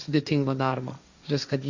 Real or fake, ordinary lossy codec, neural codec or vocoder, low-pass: fake; Opus, 64 kbps; codec, 16 kHz, 1.1 kbps, Voila-Tokenizer; 7.2 kHz